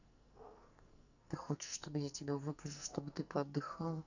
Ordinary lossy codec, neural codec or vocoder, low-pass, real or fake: none; codec, 44.1 kHz, 2.6 kbps, SNAC; 7.2 kHz; fake